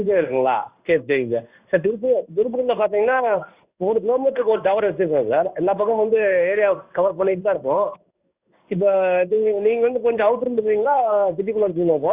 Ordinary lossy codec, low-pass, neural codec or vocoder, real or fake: Opus, 64 kbps; 3.6 kHz; codec, 16 kHz in and 24 kHz out, 1 kbps, XY-Tokenizer; fake